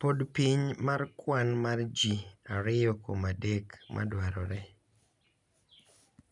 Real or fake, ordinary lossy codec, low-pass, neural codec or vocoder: real; none; 10.8 kHz; none